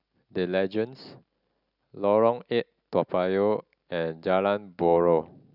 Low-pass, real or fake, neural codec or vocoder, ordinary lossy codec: 5.4 kHz; real; none; none